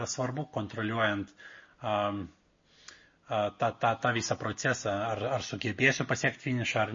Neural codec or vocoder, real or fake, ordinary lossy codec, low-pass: none; real; MP3, 32 kbps; 7.2 kHz